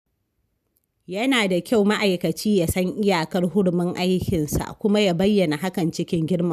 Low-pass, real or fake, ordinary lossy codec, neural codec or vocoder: 14.4 kHz; real; none; none